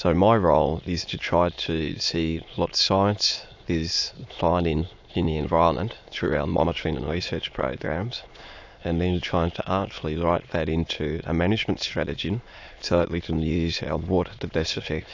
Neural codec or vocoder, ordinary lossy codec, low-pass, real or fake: autoencoder, 22.05 kHz, a latent of 192 numbers a frame, VITS, trained on many speakers; AAC, 48 kbps; 7.2 kHz; fake